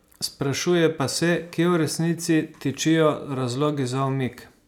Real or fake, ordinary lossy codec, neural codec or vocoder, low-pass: real; none; none; 19.8 kHz